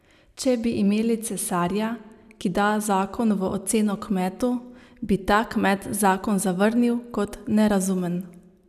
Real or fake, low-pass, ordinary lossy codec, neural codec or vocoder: real; 14.4 kHz; none; none